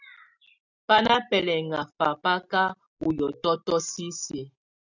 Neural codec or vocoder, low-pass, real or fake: none; 7.2 kHz; real